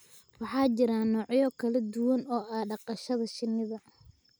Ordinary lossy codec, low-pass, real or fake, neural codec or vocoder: none; none; real; none